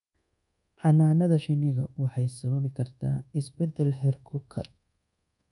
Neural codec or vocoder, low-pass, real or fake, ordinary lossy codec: codec, 24 kHz, 1.2 kbps, DualCodec; 10.8 kHz; fake; none